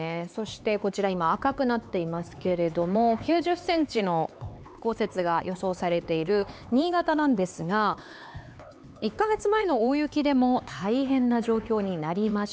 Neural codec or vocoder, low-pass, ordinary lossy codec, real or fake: codec, 16 kHz, 4 kbps, X-Codec, HuBERT features, trained on LibriSpeech; none; none; fake